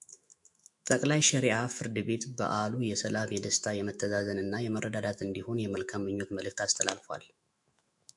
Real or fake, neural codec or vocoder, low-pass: fake; autoencoder, 48 kHz, 128 numbers a frame, DAC-VAE, trained on Japanese speech; 10.8 kHz